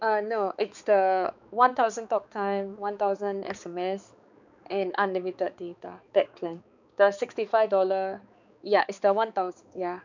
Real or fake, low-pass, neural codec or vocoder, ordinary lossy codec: fake; 7.2 kHz; codec, 16 kHz, 4 kbps, X-Codec, HuBERT features, trained on balanced general audio; none